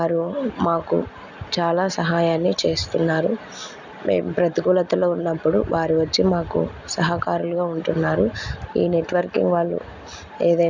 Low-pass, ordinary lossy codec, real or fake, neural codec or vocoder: 7.2 kHz; none; real; none